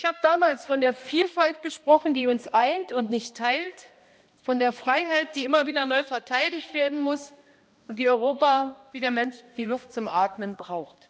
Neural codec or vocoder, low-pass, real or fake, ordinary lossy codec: codec, 16 kHz, 1 kbps, X-Codec, HuBERT features, trained on balanced general audio; none; fake; none